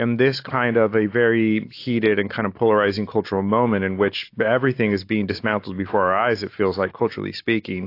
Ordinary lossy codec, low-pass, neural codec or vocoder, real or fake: AAC, 32 kbps; 5.4 kHz; none; real